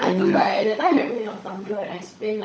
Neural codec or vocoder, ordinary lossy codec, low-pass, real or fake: codec, 16 kHz, 16 kbps, FunCodec, trained on LibriTTS, 50 frames a second; none; none; fake